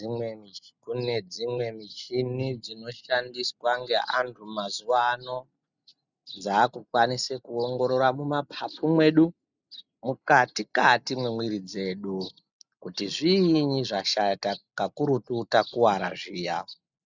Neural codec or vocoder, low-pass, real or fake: none; 7.2 kHz; real